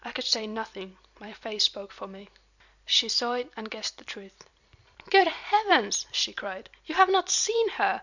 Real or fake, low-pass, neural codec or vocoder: fake; 7.2 kHz; vocoder, 44.1 kHz, 128 mel bands every 256 samples, BigVGAN v2